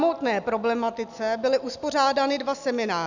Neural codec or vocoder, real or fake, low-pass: none; real; 7.2 kHz